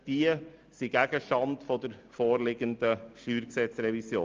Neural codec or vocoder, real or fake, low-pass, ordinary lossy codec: none; real; 7.2 kHz; Opus, 24 kbps